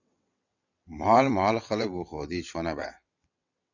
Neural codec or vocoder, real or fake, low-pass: vocoder, 22.05 kHz, 80 mel bands, WaveNeXt; fake; 7.2 kHz